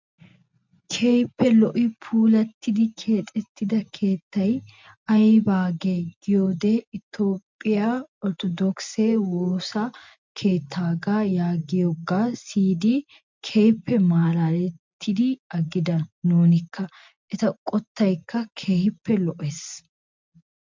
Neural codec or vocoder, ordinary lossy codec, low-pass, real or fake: none; MP3, 64 kbps; 7.2 kHz; real